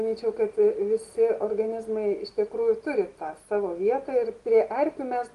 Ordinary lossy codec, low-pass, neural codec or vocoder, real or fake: Opus, 24 kbps; 10.8 kHz; none; real